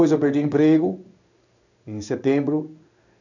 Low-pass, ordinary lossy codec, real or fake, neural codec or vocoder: 7.2 kHz; none; fake; codec, 16 kHz in and 24 kHz out, 1 kbps, XY-Tokenizer